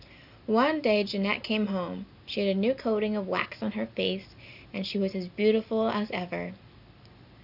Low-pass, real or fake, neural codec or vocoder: 5.4 kHz; real; none